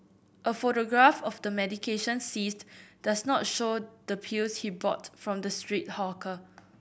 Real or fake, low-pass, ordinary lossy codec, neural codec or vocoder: real; none; none; none